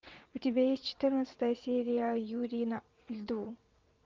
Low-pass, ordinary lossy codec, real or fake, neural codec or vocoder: 7.2 kHz; Opus, 24 kbps; fake; vocoder, 22.05 kHz, 80 mel bands, WaveNeXt